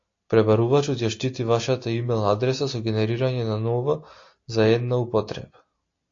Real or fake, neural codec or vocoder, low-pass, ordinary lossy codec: real; none; 7.2 kHz; AAC, 48 kbps